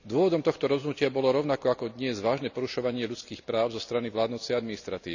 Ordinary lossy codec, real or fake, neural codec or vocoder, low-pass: none; real; none; 7.2 kHz